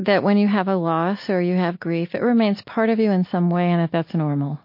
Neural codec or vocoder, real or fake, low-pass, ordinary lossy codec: none; real; 5.4 kHz; MP3, 32 kbps